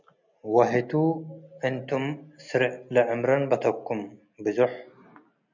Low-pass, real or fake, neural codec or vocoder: 7.2 kHz; real; none